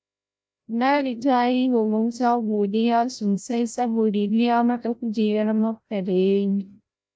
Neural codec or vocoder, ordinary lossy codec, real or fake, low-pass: codec, 16 kHz, 0.5 kbps, FreqCodec, larger model; none; fake; none